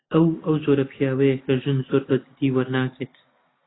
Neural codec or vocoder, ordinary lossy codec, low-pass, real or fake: none; AAC, 16 kbps; 7.2 kHz; real